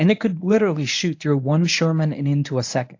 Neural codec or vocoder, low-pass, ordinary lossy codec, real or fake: codec, 24 kHz, 0.9 kbps, WavTokenizer, medium speech release version 1; 7.2 kHz; AAC, 48 kbps; fake